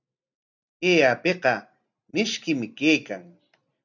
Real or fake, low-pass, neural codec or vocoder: real; 7.2 kHz; none